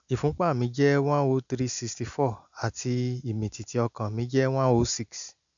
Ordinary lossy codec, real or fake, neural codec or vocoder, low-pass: MP3, 96 kbps; real; none; 7.2 kHz